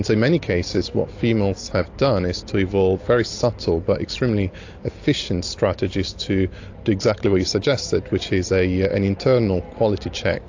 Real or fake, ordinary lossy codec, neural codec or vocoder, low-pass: real; AAC, 48 kbps; none; 7.2 kHz